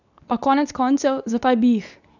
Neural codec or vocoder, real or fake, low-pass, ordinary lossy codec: codec, 24 kHz, 0.9 kbps, WavTokenizer, medium speech release version 2; fake; 7.2 kHz; none